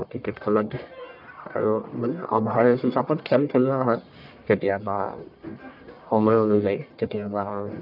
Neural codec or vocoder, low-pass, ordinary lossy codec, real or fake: codec, 44.1 kHz, 1.7 kbps, Pupu-Codec; 5.4 kHz; none; fake